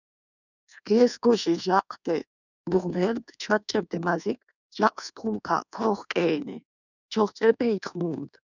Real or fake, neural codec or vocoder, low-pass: fake; codec, 24 kHz, 1.2 kbps, DualCodec; 7.2 kHz